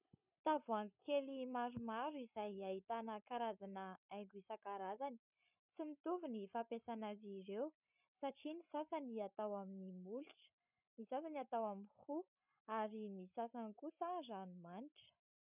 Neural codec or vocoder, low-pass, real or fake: codec, 16 kHz, 16 kbps, FreqCodec, larger model; 3.6 kHz; fake